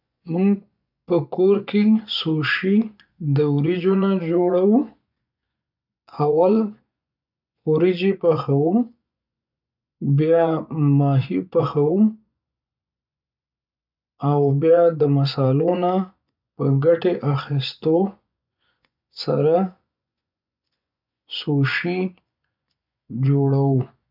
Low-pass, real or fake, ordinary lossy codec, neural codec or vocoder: 5.4 kHz; fake; none; vocoder, 24 kHz, 100 mel bands, Vocos